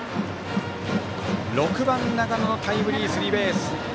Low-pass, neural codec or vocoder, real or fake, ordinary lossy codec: none; none; real; none